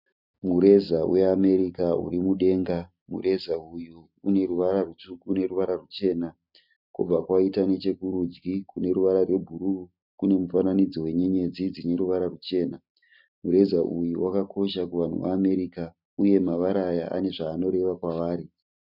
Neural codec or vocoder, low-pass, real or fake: none; 5.4 kHz; real